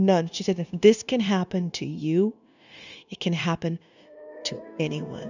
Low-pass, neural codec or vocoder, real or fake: 7.2 kHz; codec, 16 kHz, 0.9 kbps, LongCat-Audio-Codec; fake